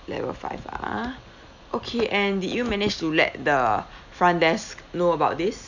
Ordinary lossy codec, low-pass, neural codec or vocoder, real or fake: none; 7.2 kHz; none; real